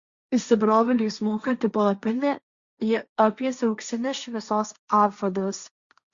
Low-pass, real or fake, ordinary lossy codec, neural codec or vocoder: 7.2 kHz; fake; Opus, 64 kbps; codec, 16 kHz, 1.1 kbps, Voila-Tokenizer